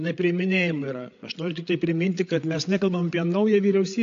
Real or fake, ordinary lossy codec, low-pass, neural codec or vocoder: fake; AAC, 48 kbps; 7.2 kHz; codec, 16 kHz, 8 kbps, FreqCodec, larger model